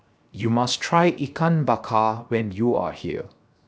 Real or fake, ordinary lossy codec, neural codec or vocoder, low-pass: fake; none; codec, 16 kHz, 0.7 kbps, FocalCodec; none